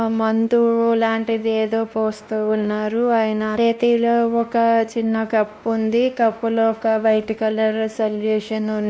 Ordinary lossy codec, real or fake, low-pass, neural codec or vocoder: none; fake; none; codec, 16 kHz, 1 kbps, X-Codec, WavLM features, trained on Multilingual LibriSpeech